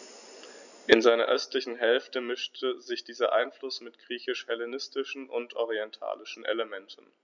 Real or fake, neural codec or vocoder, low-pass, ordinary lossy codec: real; none; 7.2 kHz; none